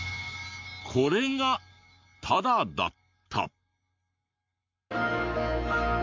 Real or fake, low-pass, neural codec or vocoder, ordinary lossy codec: fake; 7.2 kHz; codec, 44.1 kHz, 7.8 kbps, Pupu-Codec; MP3, 64 kbps